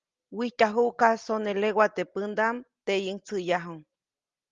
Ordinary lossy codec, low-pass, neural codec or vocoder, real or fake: Opus, 32 kbps; 7.2 kHz; none; real